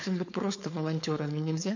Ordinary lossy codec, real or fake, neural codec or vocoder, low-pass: none; fake; codec, 16 kHz, 4.8 kbps, FACodec; 7.2 kHz